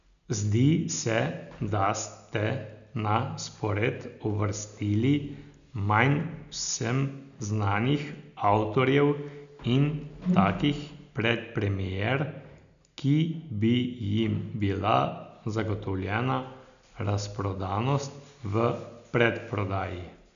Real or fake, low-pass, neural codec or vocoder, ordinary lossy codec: real; 7.2 kHz; none; none